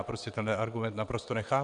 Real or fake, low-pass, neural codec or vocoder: fake; 9.9 kHz; vocoder, 22.05 kHz, 80 mel bands, WaveNeXt